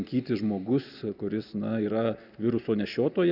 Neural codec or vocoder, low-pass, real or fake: none; 5.4 kHz; real